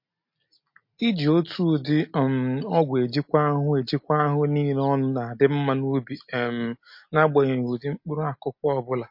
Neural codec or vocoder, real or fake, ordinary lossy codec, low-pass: none; real; MP3, 32 kbps; 5.4 kHz